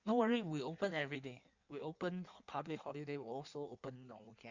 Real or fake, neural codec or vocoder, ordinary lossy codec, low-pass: fake; codec, 16 kHz in and 24 kHz out, 1.1 kbps, FireRedTTS-2 codec; Opus, 64 kbps; 7.2 kHz